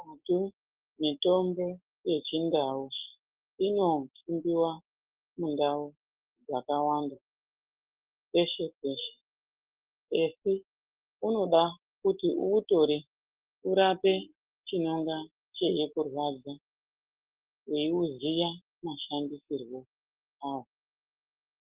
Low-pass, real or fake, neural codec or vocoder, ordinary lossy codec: 3.6 kHz; real; none; Opus, 16 kbps